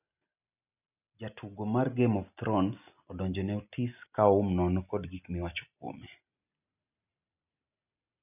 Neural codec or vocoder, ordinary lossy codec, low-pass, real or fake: none; none; 3.6 kHz; real